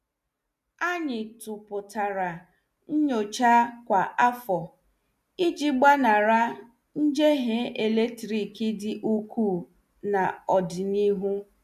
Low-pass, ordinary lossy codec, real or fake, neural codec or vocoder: 14.4 kHz; none; real; none